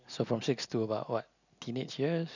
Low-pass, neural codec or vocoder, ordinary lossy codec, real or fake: 7.2 kHz; none; none; real